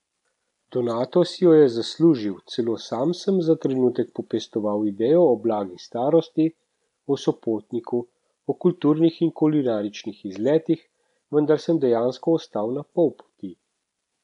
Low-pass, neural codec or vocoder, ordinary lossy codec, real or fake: 10.8 kHz; none; none; real